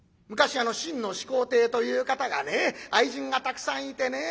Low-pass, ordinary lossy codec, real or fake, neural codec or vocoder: none; none; real; none